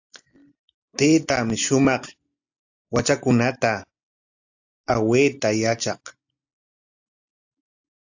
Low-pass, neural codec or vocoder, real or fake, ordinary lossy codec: 7.2 kHz; none; real; AAC, 48 kbps